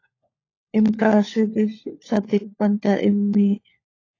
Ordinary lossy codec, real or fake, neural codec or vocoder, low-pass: AAC, 32 kbps; fake; codec, 16 kHz, 4 kbps, FunCodec, trained on LibriTTS, 50 frames a second; 7.2 kHz